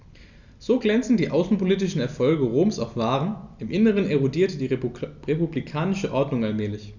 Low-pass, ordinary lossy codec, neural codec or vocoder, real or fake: 7.2 kHz; none; none; real